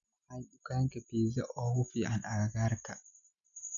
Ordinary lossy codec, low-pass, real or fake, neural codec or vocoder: none; 7.2 kHz; real; none